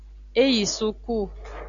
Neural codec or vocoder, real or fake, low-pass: none; real; 7.2 kHz